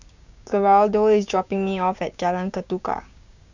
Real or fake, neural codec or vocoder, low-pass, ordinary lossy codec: fake; codec, 16 kHz, 6 kbps, DAC; 7.2 kHz; none